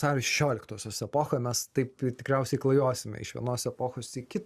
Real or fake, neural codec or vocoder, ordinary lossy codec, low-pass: real; none; Opus, 64 kbps; 14.4 kHz